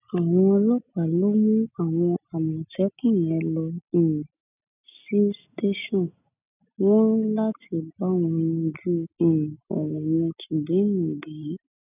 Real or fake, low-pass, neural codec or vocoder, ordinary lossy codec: real; 3.6 kHz; none; none